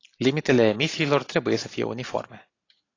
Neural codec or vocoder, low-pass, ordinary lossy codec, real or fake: none; 7.2 kHz; AAC, 32 kbps; real